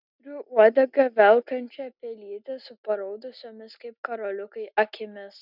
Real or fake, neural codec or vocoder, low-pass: real; none; 5.4 kHz